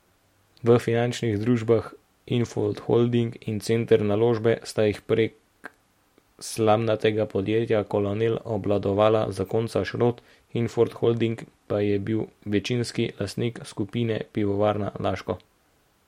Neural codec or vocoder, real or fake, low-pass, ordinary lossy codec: none; real; 19.8 kHz; MP3, 64 kbps